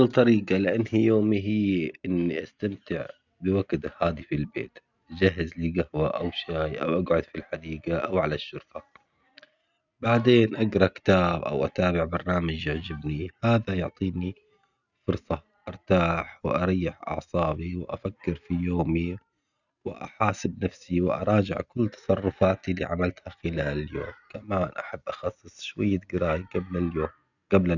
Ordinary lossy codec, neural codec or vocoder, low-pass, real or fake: none; none; 7.2 kHz; real